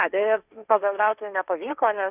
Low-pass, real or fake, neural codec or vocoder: 3.6 kHz; fake; codec, 16 kHz, 1.1 kbps, Voila-Tokenizer